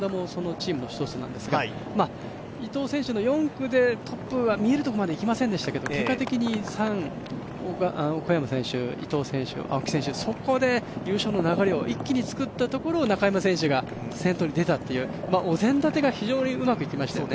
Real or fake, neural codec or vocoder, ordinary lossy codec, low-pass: real; none; none; none